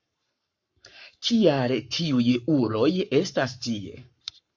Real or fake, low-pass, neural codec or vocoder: fake; 7.2 kHz; codec, 44.1 kHz, 7.8 kbps, Pupu-Codec